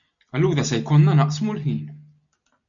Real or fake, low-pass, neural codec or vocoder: real; 7.2 kHz; none